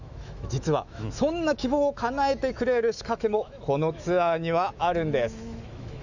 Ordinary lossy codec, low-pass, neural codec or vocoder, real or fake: none; 7.2 kHz; autoencoder, 48 kHz, 128 numbers a frame, DAC-VAE, trained on Japanese speech; fake